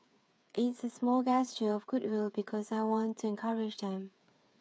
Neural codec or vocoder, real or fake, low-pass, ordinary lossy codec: codec, 16 kHz, 8 kbps, FreqCodec, smaller model; fake; none; none